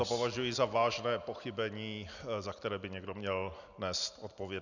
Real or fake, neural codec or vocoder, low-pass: real; none; 7.2 kHz